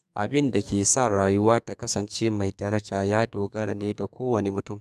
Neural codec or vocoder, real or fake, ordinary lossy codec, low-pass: codec, 44.1 kHz, 2.6 kbps, SNAC; fake; none; 14.4 kHz